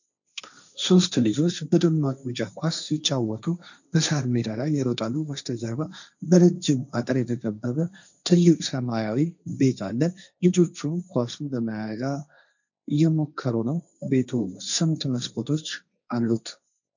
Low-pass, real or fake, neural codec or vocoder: 7.2 kHz; fake; codec, 16 kHz, 1.1 kbps, Voila-Tokenizer